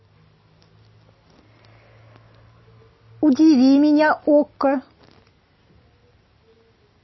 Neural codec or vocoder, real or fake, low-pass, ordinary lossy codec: none; real; 7.2 kHz; MP3, 24 kbps